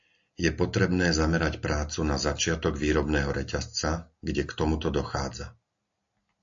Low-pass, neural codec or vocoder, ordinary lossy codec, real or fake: 7.2 kHz; none; AAC, 64 kbps; real